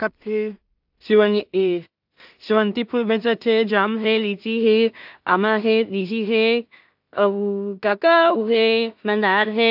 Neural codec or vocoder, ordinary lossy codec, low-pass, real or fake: codec, 16 kHz in and 24 kHz out, 0.4 kbps, LongCat-Audio-Codec, two codebook decoder; AAC, 48 kbps; 5.4 kHz; fake